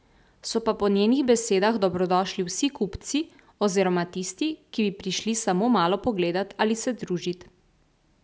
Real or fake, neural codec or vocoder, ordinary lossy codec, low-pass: real; none; none; none